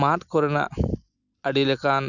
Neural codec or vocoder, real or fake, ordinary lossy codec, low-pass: none; real; none; 7.2 kHz